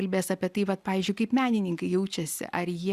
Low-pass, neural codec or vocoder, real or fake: 14.4 kHz; none; real